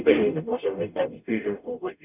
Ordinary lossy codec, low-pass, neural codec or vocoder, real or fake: none; 3.6 kHz; codec, 44.1 kHz, 0.9 kbps, DAC; fake